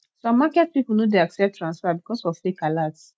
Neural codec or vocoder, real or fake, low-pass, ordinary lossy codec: none; real; none; none